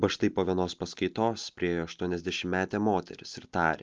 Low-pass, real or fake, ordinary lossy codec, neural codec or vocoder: 7.2 kHz; real; Opus, 24 kbps; none